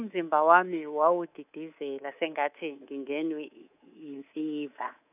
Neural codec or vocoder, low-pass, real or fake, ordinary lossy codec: codec, 24 kHz, 3.1 kbps, DualCodec; 3.6 kHz; fake; none